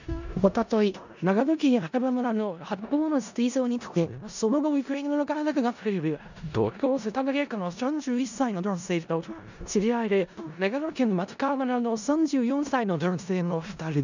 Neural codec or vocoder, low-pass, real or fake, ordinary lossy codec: codec, 16 kHz in and 24 kHz out, 0.4 kbps, LongCat-Audio-Codec, four codebook decoder; 7.2 kHz; fake; none